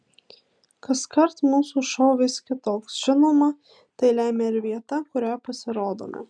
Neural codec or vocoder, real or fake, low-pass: none; real; 9.9 kHz